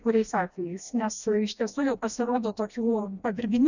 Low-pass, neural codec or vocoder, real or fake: 7.2 kHz; codec, 16 kHz, 1 kbps, FreqCodec, smaller model; fake